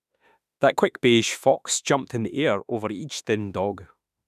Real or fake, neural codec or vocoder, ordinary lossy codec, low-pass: fake; autoencoder, 48 kHz, 32 numbers a frame, DAC-VAE, trained on Japanese speech; none; 14.4 kHz